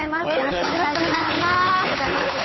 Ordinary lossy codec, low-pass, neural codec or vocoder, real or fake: MP3, 24 kbps; 7.2 kHz; codec, 16 kHz, 8 kbps, FunCodec, trained on Chinese and English, 25 frames a second; fake